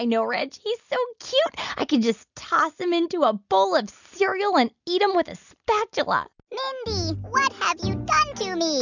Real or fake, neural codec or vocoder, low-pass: real; none; 7.2 kHz